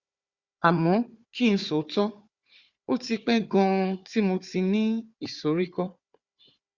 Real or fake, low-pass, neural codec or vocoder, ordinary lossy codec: fake; 7.2 kHz; codec, 16 kHz, 4 kbps, FunCodec, trained on Chinese and English, 50 frames a second; Opus, 64 kbps